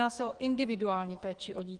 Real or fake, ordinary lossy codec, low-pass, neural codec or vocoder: fake; Opus, 24 kbps; 10.8 kHz; codec, 44.1 kHz, 2.6 kbps, SNAC